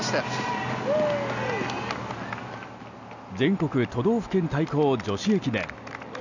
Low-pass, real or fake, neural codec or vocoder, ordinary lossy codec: 7.2 kHz; real; none; none